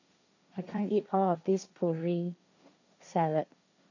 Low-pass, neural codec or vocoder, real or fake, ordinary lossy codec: 7.2 kHz; codec, 16 kHz, 1.1 kbps, Voila-Tokenizer; fake; none